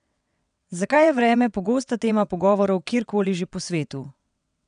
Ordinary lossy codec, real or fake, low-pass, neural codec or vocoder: none; fake; 9.9 kHz; vocoder, 22.05 kHz, 80 mel bands, WaveNeXt